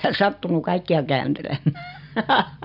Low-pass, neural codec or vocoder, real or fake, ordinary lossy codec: 5.4 kHz; none; real; none